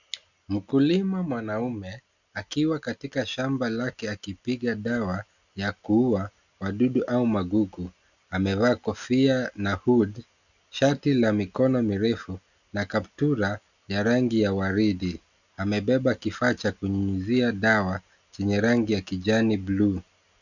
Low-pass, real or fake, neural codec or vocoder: 7.2 kHz; real; none